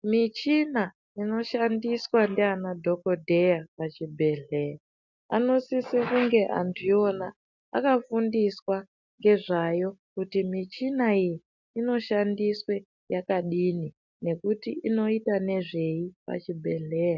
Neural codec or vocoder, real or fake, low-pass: none; real; 7.2 kHz